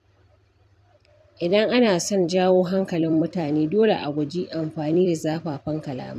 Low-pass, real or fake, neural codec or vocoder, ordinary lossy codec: 14.4 kHz; fake; vocoder, 44.1 kHz, 128 mel bands every 256 samples, BigVGAN v2; none